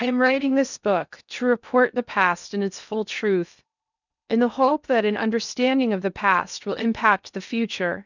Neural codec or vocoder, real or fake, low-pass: codec, 16 kHz in and 24 kHz out, 0.8 kbps, FocalCodec, streaming, 65536 codes; fake; 7.2 kHz